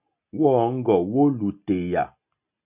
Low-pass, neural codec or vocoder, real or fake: 3.6 kHz; none; real